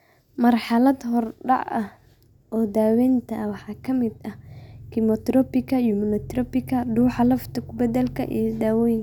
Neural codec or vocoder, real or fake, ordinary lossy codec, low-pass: none; real; none; 19.8 kHz